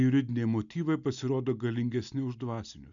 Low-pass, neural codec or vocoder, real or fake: 7.2 kHz; none; real